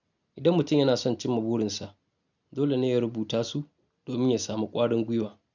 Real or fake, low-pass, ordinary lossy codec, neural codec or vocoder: real; 7.2 kHz; none; none